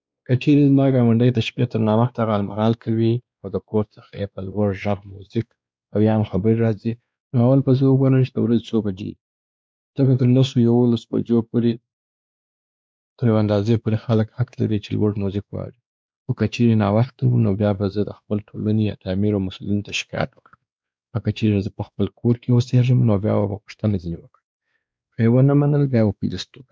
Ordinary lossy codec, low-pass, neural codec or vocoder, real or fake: none; none; codec, 16 kHz, 2 kbps, X-Codec, WavLM features, trained on Multilingual LibriSpeech; fake